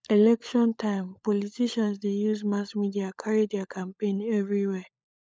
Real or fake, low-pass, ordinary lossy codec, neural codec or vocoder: fake; none; none; codec, 16 kHz, 16 kbps, FunCodec, trained on LibriTTS, 50 frames a second